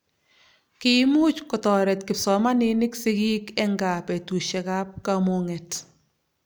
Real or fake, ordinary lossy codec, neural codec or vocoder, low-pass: real; none; none; none